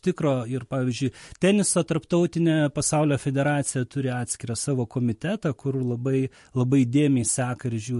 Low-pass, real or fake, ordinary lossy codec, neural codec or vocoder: 14.4 kHz; real; MP3, 48 kbps; none